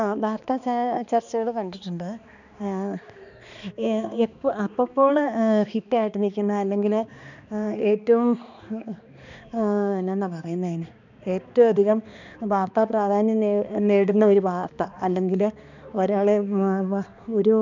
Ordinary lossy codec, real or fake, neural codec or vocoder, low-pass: none; fake; codec, 16 kHz, 2 kbps, X-Codec, HuBERT features, trained on balanced general audio; 7.2 kHz